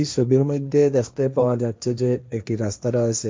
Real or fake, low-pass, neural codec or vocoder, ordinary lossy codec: fake; none; codec, 16 kHz, 1.1 kbps, Voila-Tokenizer; none